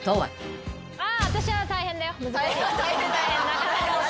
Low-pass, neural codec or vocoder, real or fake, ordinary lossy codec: none; none; real; none